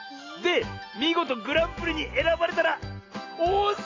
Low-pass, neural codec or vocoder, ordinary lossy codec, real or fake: 7.2 kHz; none; AAC, 32 kbps; real